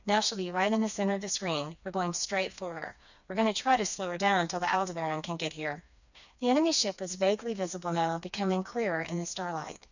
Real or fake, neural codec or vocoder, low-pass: fake; codec, 16 kHz, 2 kbps, FreqCodec, smaller model; 7.2 kHz